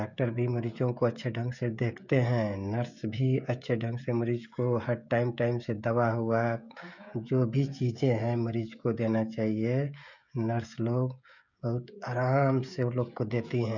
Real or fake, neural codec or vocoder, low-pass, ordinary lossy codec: fake; codec, 16 kHz, 16 kbps, FreqCodec, smaller model; 7.2 kHz; none